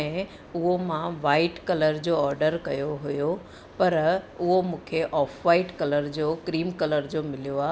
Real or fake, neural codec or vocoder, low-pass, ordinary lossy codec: real; none; none; none